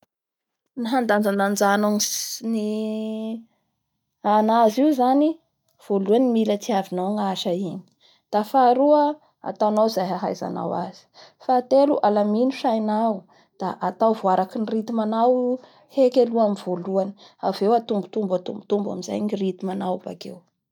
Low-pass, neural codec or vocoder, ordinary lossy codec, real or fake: 19.8 kHz; none; none; real